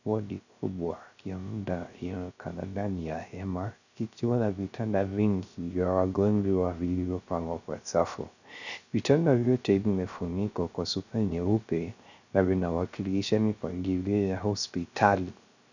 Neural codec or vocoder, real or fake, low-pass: codec, 16 kHz, 0.3 kbps, FocalCodec; fake; 7.2 kHz